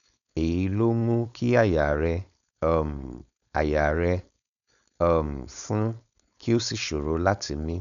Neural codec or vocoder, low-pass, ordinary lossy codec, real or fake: codec, 16 kHz, 4.8 kbps, FACodec; 7.2 kHz; none; fake